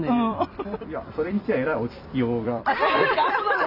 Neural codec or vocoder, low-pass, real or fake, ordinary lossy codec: vocoder, 44.1 kHz, 128 mel bands every 256 samples, BigVGAN v2; 5.4 kHz; fake; none